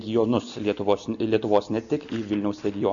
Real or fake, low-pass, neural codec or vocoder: real; 7.2 kHz; none